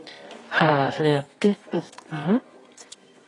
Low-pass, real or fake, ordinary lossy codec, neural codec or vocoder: 10.8 kHz; fake; AAC, 32 kbps; codec, 24 kHz, 0.9 kbps, WavTokenizer, medium music audio release